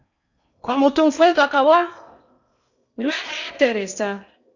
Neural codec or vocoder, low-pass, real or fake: codec, 16 kHz in and 24 kHz out, 0.8 kbps, FocalCodec, streaming, 65536 codes; 7.2 kHz; fake